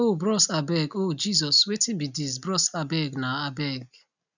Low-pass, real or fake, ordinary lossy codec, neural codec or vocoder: 7.2 kHz; real; none; none